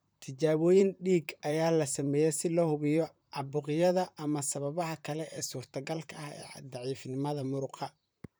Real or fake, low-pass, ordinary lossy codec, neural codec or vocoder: fake; none; none; vocoder, 44.1 kHz, 128 mel bands, Pupu-Vocoder